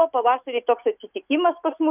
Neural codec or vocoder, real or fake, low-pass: none; real; 3.6 kHz